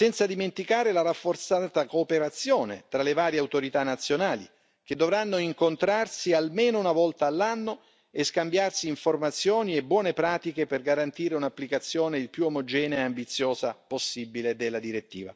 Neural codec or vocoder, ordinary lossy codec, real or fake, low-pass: none; none; real; none